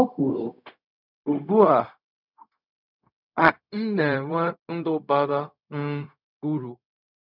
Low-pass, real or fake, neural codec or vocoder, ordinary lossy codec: 5.4 kHz; fake; codec, 16 kHz, 0.4 kbps, LongCat-Audio-Codec; none